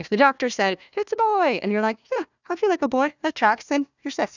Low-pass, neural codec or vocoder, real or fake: 7.2 kHz; codec, 16 kHz, 1 kbps, FunCodec, trained on Chinese and English, 50 frames a second; fake